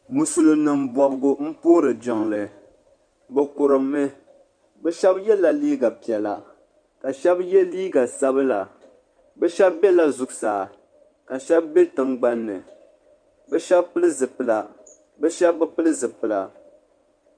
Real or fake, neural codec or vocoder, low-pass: fake; codec, 16 kHz in and 24 kHz out, 2.2 kbps, FireRedTTS-2 codec; 9.9 kHz